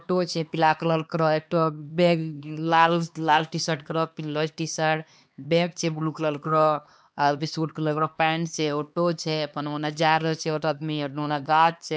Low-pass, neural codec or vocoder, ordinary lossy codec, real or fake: none; codec, 16 kHz, 2 kbps, X-Codec, HuBERT features, trained on LibriSpeech; none; fake